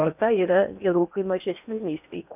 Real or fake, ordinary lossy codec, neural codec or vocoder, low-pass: fake; AAC, 32 kbps; codec, 16 kHz in and 24 kHz out, 0.8 kbps, FocalCodec, streaming, 65536 codes; 3.6 kHz